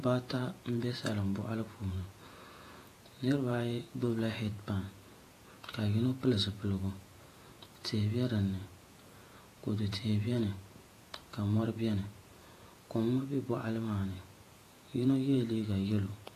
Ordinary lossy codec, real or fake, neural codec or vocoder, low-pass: AAC, 48 kbps; fake; vocoder, 48 kHz, 128 mel bands, Vocos; 14.4 kHz